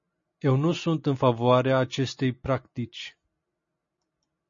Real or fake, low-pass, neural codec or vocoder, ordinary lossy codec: real; 7.2 kHz; none; MP3, 32 kbps